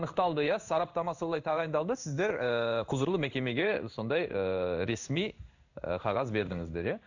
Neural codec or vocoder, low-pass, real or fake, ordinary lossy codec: codec, 16 kHz in and 24 kHz out, 1 kbps, XY-Tokenizer; 7.2 kHz; fake; none